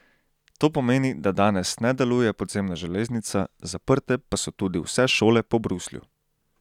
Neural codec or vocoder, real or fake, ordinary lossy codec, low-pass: none; real; none; 19.8 kHz